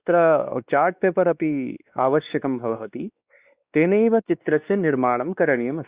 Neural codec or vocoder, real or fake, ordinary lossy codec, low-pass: codec, 16 kHz, 2 kbps, X-Codec, WavLM features, trained on Multilingual LibriSpeech; fake; Opus, 64 kbps; 3.6 kHz